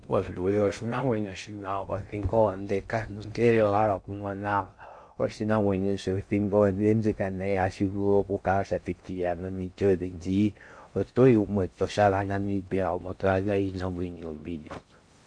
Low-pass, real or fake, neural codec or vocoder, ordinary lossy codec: 9.9 kHz; fake; codec, 16 kHz in and 24 kHz out, 0.6 kbps, FocalCodec, streaming, 2048 codes; MP3, 64 kbps